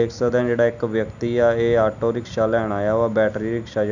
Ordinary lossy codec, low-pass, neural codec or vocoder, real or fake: none; 7.2 kHz; none; real